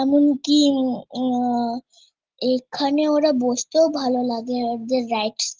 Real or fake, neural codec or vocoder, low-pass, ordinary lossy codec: real; none; 7.2 kHz; Opus, 16 kbps